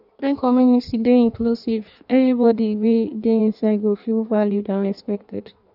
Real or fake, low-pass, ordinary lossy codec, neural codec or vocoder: fake; 5.4 kHz; none; codec, 16 kHz in and 24 kHz out, 1.1 kbps, FireRedTTS-2 codec